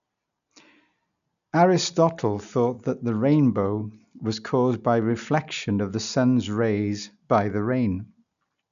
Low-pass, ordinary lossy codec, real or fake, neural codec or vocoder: 7.2 kHz; none; real; none